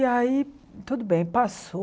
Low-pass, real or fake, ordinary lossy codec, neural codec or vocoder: none; real; none; none